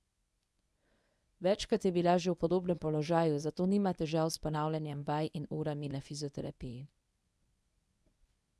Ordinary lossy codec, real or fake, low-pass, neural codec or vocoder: none; fake; none; codec, 24 kHz, 0.9 kbps, WavTokenizer, medium speech release version 1